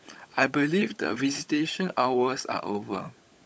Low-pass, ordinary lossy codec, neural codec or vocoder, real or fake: none; none; codec, 16 kHz, 16 kbps, FunCodec, trained on LibriTTS, 50 frames a second; fake